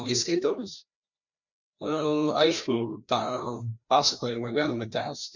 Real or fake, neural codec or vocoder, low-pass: fake; codec, 16 kHz, 1 kbps, FreqCodec, larger model; 7.2 kHz